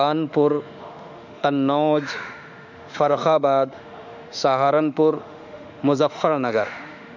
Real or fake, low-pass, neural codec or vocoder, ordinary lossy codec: fake; 7.2 kHz; autoencoder, 48 kHz, 32 numbers a frame, DAC-VAE, trained on Japanese speech; none